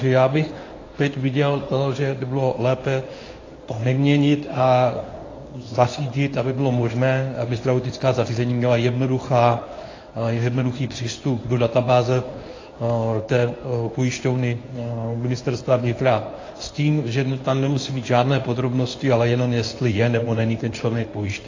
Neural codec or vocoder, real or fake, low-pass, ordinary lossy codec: codec, 24 kHz, 0.9 kbps, WavTokenizer, medium speech release version 1; fake; 7.2 kHz; AAC, 32 kbps